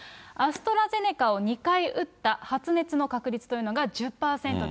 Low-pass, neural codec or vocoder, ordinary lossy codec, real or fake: none; none; none; real